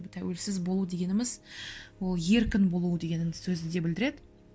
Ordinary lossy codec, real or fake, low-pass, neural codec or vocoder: none; real; none; none